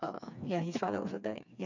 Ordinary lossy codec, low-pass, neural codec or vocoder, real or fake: none; 7.2 kHz; codec, 16 kHz, 4 kbps, FreqCodec, smaller model; fake